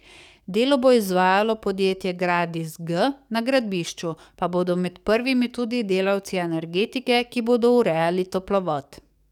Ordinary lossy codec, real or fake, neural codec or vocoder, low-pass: none; fake; codec, 44.1 kHz, 7.8 kbps, DAC; 19.8 kHz